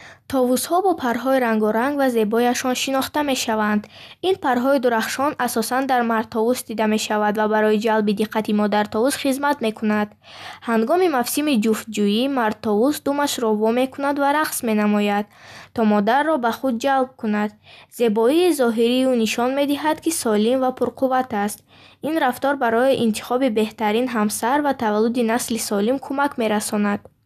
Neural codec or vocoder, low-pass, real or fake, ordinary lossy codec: none; 14.4 kHz; real; none